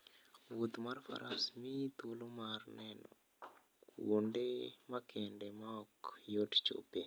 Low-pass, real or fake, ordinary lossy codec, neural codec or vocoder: none; real; none; none